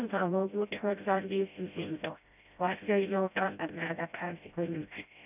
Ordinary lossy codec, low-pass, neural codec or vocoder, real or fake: none; 3.6 kHz; codec, 16 kHz, 0.5 kbps, FreqCodec, smaller model; fake